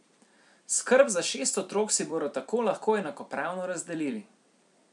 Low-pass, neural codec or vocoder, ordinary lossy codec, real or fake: 10.8 kHz; vocoder, 44.1 kHz, 128 mel bands every 256 samples, BigVGAN v2; MP3, 96 kbps; fake